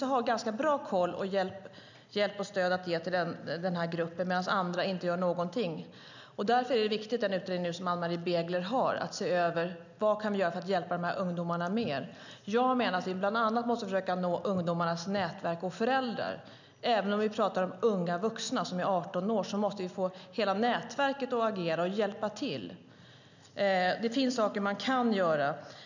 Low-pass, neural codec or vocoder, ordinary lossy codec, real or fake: 7.2 kHz; none; none; real